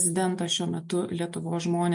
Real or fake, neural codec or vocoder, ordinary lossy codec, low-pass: real; none; MP3, 48 kbps; 10.8 kHz